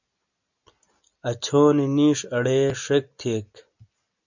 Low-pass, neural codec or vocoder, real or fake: 7.2 kHz; none; real